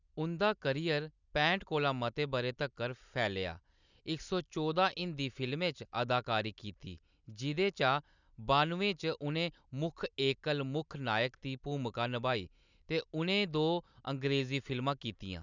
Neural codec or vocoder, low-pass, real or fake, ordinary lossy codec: none; 7.2 kHz; real; none